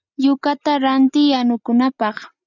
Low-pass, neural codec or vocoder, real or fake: 7.2 kHz; none; real